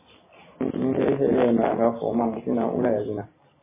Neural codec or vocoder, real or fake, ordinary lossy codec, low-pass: none; real; MP3, 16 kbps; 3.6 kHz